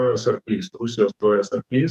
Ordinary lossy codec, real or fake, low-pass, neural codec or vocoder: MP3, 96 kbps; fake; 14.4 kHz; codec, 44.1 kHz, 2.6 kbps, SNAC